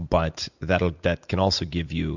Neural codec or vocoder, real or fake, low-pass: none; real; 7.2 kHz